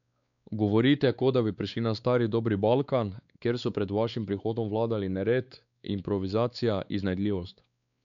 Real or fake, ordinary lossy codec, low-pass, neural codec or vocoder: fake; none; 7.2 kHz; codec, 16 kHz, 4 kbps, X-Codec, WavLM features, trained on Multilingual LibriSpeech